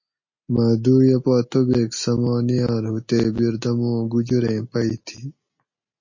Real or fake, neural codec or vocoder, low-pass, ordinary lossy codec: real; none; 7.2 kHz; MP3, 32 kbps